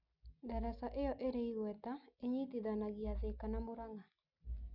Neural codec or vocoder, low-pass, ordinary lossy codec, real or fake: none; 5.4 kHz; none; real